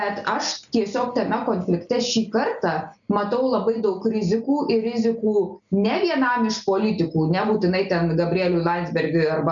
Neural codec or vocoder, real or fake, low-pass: none; real; 7.2 kHz